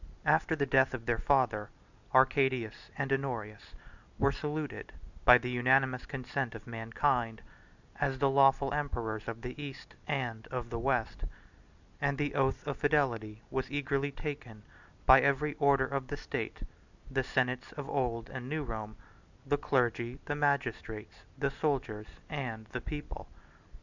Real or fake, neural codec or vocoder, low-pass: real; none; 7.2 kHz